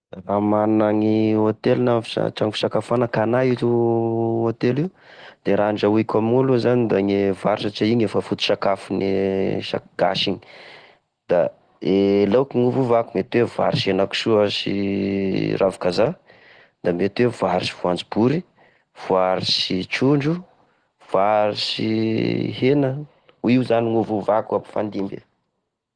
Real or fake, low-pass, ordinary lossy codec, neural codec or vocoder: real; 9.9 kHz; Opus, 16 kbps; none